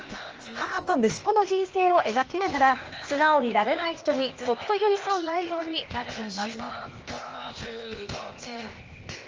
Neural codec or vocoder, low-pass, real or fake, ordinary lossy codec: codec, 16 kHz, 0.8 kbps, ZipCodec; 7.2 kHz; fake; Opus, 24 kbps